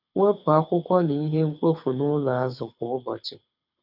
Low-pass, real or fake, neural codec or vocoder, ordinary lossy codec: 5.4 kHz; fake; codec, 24 kHz, 6 kbps, HILCodec; none